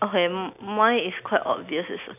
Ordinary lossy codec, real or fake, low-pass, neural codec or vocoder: none; real; 3.6 kHz; none